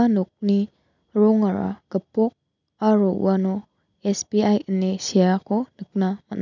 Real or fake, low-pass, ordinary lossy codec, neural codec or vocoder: real; 7.2 kHz; none; none